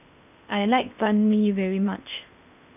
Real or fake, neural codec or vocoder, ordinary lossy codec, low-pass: fake; codec, 16 kHz in and 24 kHz out, 0.8 kbps, FocalCodec, streaming, 65536 codes; none; 3.6 kHz